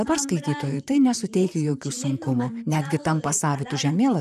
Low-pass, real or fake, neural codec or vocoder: 14.4 kHz; fake; vocoder, 44.1 kHz, 128 mel bands, Pupu-Vocoder